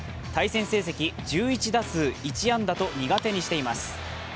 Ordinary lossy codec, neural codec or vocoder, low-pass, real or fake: none; none; none; real